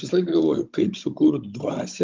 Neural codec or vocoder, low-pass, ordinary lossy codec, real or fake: codec, 16 kHz, 16 kbps, FunCodec, trained on Chinese and English, 50 frames a second; 7.2 kHz; Opus, 24 kbps; fake